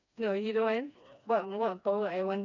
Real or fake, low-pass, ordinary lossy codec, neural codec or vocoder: fake; 7.2 kHz; none; codec, 16 kHz, 2 kbps, FreqCodec, smaller model